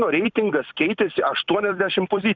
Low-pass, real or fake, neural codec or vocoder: 7.2 kHz; fake; vocoder, 44.1 kHz, 128 mel bands every 256 samples, BigVGAN v2